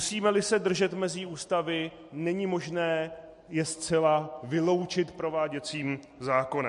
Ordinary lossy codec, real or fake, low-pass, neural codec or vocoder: MP3, 48 kbps; real; 14.4 kHz; none